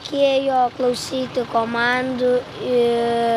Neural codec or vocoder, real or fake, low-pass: none; real; 14.4 kHz